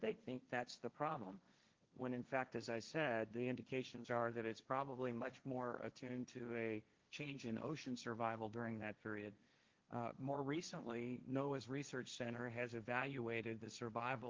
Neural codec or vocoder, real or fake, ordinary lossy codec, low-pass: codec, 16 kHz, 1.1 kbps, Voila-Tokenizer; fake; Opus, 32 kbps; 7.2 kHz